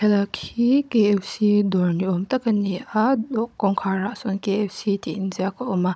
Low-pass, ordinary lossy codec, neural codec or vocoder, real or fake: none; none; codec, 16 kHz, 16 kbps, FunCodec, trained on Chinese and English, 50 frames a second; fake